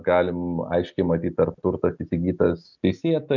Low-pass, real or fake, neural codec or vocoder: 7.2 kHz; real; none